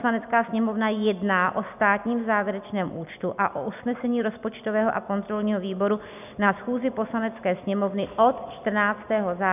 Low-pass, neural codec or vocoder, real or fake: 3.6 kHz; none; real